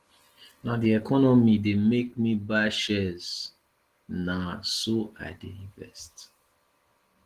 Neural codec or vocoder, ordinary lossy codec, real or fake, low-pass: none; Opus, 16 kbps; real; 14.4 kHz